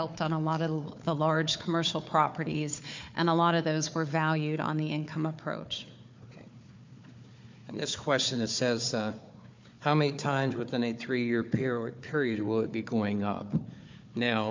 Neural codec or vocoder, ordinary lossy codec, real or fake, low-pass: codec, 16 kHz, 4 kbps, FunCodec, trained on Chinese and English, 50 frames a second; MP3, 64 kbps; fake; 7.2 kHz